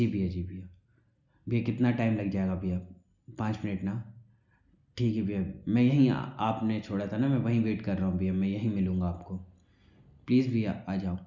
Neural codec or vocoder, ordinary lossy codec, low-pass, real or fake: none; none; 7.2 kHz; real